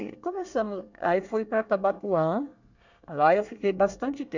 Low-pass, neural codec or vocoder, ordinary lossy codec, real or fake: 7.2 kHz; codec, 24 kHz, 1 kbps, SNAC; none; fake